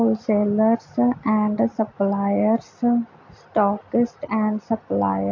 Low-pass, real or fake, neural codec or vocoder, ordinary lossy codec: 7.2 kHz; real; none; AAC, 48 kbps